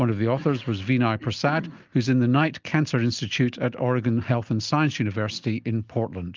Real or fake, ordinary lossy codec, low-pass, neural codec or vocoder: real; Opus, 24 kbps; 7.2 kHz; none